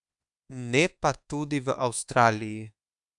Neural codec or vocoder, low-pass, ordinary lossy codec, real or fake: codec, 24 kHz, 1.2 kbps, DualCodec; 10.8 kHz; none; fake